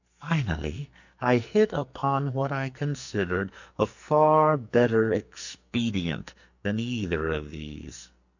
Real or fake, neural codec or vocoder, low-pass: fake; codec, 44.1 kHz, 2.6 kbps, SNAC; 7.2 kHz